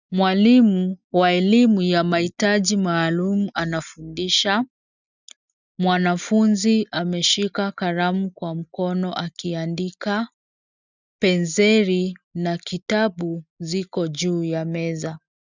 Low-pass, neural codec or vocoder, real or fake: 7.2 kHz; none; real